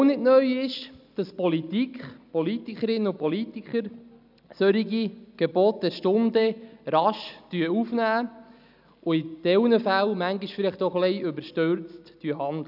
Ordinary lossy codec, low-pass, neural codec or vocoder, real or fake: none; 5.4 kHz; none; real